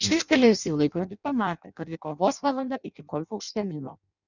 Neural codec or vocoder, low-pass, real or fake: codec, 16 kHz in and 24 kHz out, 0.6 kbps, FireRedTTS-2 codec; 7.2 kHz; fake